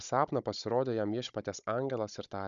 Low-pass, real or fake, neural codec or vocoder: 7.2 kHz; fake; codec, 16 kHz, 16 kbps, FunCodec, trained on Chinese and English, 50 frames a second